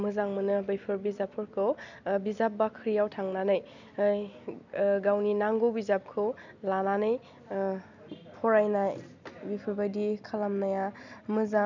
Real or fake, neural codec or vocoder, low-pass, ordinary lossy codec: real; none; 7.2 kHz; none